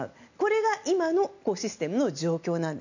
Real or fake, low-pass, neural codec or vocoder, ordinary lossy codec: real; 7.2 kHz; none; none